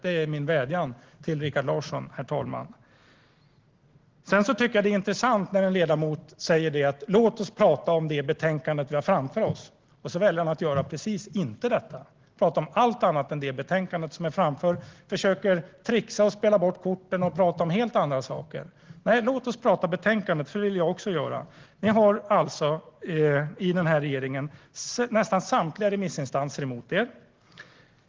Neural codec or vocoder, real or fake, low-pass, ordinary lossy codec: none; real; 7.2 kHz; Opus, 16 kbps